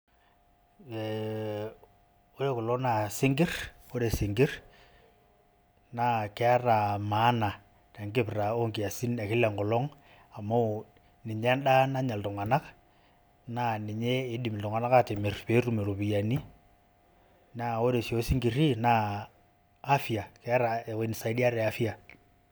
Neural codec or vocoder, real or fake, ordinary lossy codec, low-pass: none; real; none; none